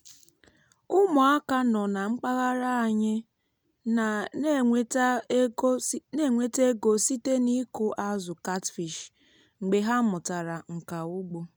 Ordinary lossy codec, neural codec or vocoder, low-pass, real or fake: none; none; none; real